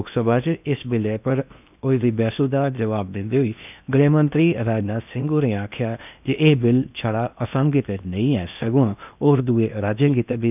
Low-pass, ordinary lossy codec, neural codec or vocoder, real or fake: 3.6 kHz; none; codec, 16 kHz in and 24 kHz out, 0.8 kbps, FocalCodec, streaming, 65536 codes; fake